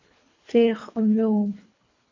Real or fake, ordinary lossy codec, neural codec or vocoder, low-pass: fake; MP3, 64 kbps; codec, 24 kHz, 3 kbps, HILCodec; 7.2 kHz